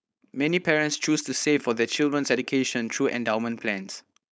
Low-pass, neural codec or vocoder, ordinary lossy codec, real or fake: none; codec, 16 kHz, 4.8 kbps, FACodec; none; fake